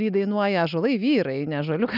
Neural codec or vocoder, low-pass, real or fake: none; 5.4 kHz; real